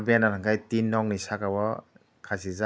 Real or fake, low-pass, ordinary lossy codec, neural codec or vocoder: real; none; none; none